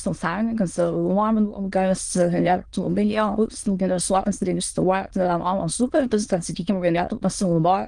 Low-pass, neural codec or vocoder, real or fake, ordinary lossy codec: 9.9 kHz; autoencoder, 22.05 kHz, a latent of 192 numbers a frame, VITS, trained on many speakers; fake; Opus, 24 kbps